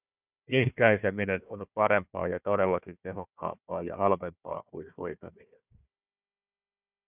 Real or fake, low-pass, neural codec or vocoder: fake; 3.6 kHz; codec, 16 kHz, 1 kbps, FunCodec, trained on Chinese and English, 50 frames a second